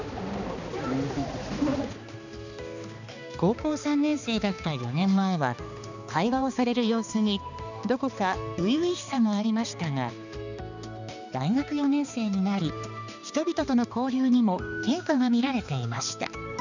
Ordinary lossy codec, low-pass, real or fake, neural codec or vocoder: none; 7.2 kHz; fake; codec, 16 kHz, 2 kbps, X-Codec, HuBERT features, trained on balanced general audio